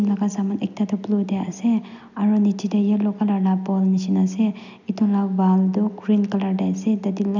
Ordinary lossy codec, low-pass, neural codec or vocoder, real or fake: none; 7.2 kHz; none; real